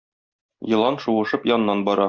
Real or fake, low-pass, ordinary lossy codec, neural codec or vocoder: real; 7.2 kHz; Opus, 64 kbps; none